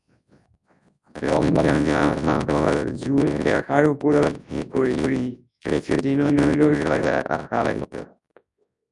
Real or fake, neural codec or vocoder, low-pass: fake; codec, 24 kHz, 0.9 kbps, WavTokenizer, large speech release; 10.8 kHz